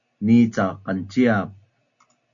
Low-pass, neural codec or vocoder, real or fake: 7.2 kHz; none; real